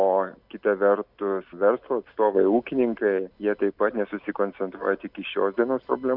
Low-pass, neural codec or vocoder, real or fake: 5.4 kHz; none; real